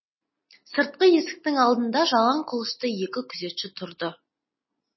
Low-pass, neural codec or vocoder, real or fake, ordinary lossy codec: 7.2 kHz; none; real; MP3, 24 kbps